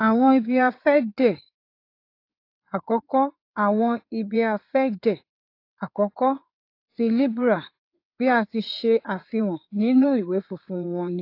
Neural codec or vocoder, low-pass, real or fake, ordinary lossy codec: codec, 16 kHz in and 24 kHz out, 2.2 kbps, FireRedTTS-2 codec; 5.4 kHz; fake; AAC, 32 kbps